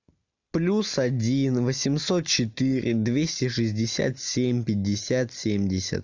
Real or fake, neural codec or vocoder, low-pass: real; none; 7.2 kHz